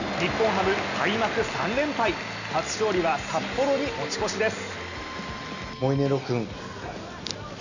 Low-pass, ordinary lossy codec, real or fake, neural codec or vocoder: 7.2 kHz; none; real; none